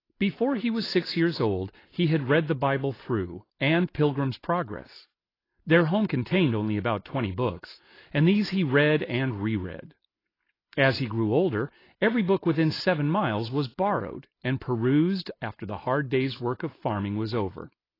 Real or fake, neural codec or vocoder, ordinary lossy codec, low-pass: real; none; AAC, 24 kbps; 5.4 kHz